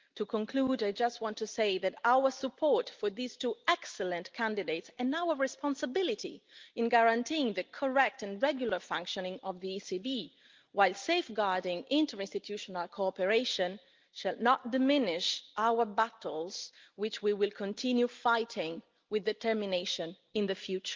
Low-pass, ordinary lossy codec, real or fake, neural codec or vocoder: 7.2 kHz; Opus, 24 kbps; real; none